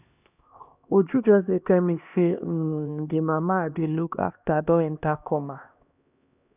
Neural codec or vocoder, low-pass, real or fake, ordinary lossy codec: codec, 16 kHz, 1 kbps, X-Codec, HuBERT features, trained on LibriSpeech; 3.6 kHz; fake; none